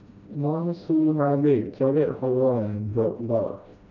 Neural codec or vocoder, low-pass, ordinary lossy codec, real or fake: codec, 16 kHz, 1 kbps, FreqCodec, smaller model; 7.2 kHz; none; fake